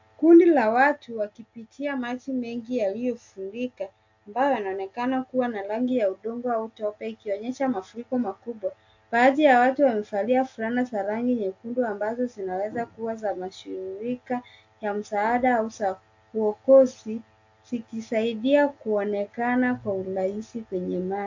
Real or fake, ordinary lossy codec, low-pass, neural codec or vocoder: real; AAC, 48 kbps; 7.2 kHz; none